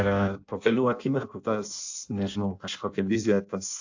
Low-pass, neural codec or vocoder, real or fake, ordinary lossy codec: 7.2 kHz; codec, 16 kHz in and 24 kHz out, 0.6 kbps, FireRedTTS-2 codec; fake; AAC, 48 kbps